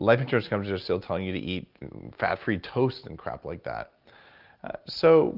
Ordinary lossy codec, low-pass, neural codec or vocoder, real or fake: Opus, 24 kbps; 5.4 kHz; none; real